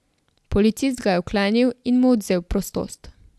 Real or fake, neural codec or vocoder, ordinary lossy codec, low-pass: real; none; none; none